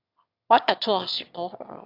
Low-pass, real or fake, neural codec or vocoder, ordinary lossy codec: 5.4 kHz; fake; autoencoder, 22.05 kHz, a latent of 192 numbers a frame, VITS, trained on one speaker; AAC, 48 kbps